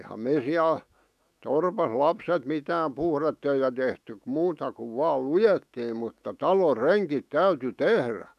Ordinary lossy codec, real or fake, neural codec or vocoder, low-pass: none; fake; autoencoder, 48 kHz, 128 numbers a frame, DAC-VAE, trained on Japanese speech; 14.4 kHz